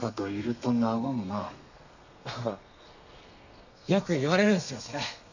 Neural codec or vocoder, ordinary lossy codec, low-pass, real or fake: codec, 32 kHz, 1.9 kbps, SNAC; none; 7.2 kHz; fake